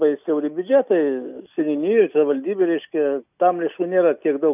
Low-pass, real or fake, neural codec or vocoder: 3.6 kHz; real; none